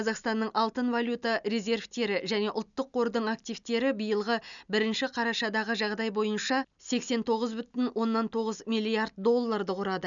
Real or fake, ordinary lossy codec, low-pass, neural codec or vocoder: real; none; 7.2 kHz; none